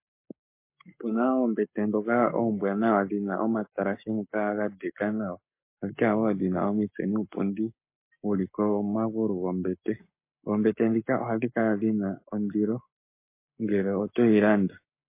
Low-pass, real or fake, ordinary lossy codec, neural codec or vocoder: 3.6 kHz; fake; MP3, 24 kbps; codec, 44.1 kHz, 7.8 kbps, Pupu-Codec